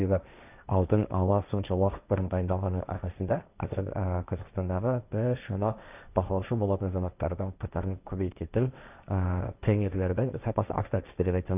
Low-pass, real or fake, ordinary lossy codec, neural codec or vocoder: 3.6 kHz; fake; none; codec, 16 kHz, 1.1 kbps, Voila-Tokenizer